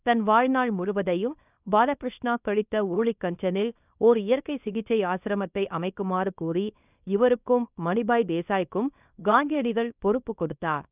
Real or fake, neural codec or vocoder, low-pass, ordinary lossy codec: fake; codec, 24 kHz, 0.9 kbps, WavTokenizer, small release; 3.6 kHz; none